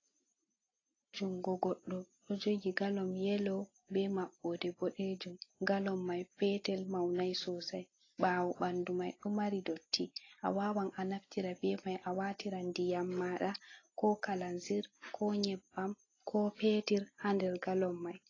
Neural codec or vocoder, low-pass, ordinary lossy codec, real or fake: none; 7.2 kHz; AAC, 32 kbps; real